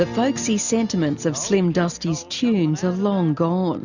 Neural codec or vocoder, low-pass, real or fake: none; 7.2 kHz; real